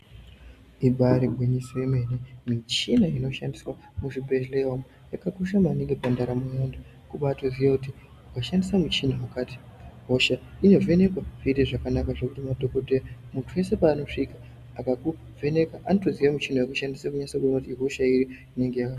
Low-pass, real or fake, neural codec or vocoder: 14.4 kHz; real; none